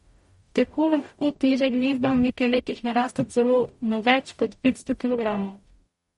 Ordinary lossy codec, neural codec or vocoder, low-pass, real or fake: MP3, 48 kbps; codec, 44.1 kHz, 0.9 kbps, DAC; 19.8 kHz; fake